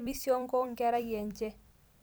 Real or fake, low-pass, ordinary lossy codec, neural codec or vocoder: fake; none; none; vocoder, 44.1 kHz, 128 mel bands every 256 samples, BigVGAN v2